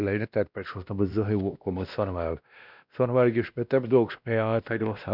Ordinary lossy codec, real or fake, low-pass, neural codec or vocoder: none; fake; 5.4 kHz; codec, 16 kHz, 1 kbps, X-Codec, WavLM features, trained on Multilingual LibriSpeech